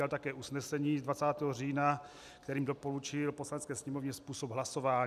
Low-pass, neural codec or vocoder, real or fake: 14.4 kHz; none; real